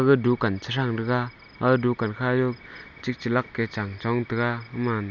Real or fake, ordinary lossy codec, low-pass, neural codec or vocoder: real; none; 7.2 kHz; none